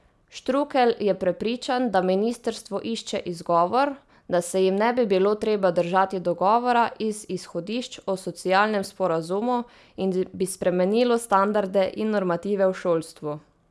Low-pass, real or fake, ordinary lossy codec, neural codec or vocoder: none; real; none; none